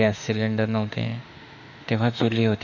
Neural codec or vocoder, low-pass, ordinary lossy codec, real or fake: autoencoder, 48 kHz, 32 numbers a frame, DAC-VAE, trained on Japanese speech; 7.2 kHz; none; fake